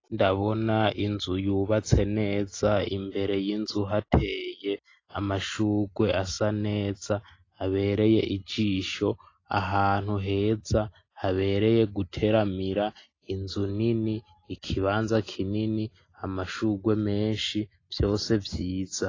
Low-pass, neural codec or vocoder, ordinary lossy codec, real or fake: 7.2 kHz; none; AAC, 32 kbps; real